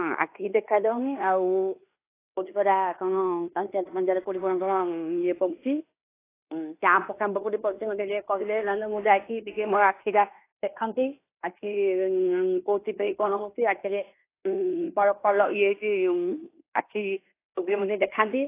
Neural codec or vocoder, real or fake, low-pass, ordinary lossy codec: codec, 16 kHz in and 24 kHz out, 0.9 kbps, LongCat-Audio-Codec, fine tuned four codebook decoder; fake; 3.6 kHz; AAC, 24 kbps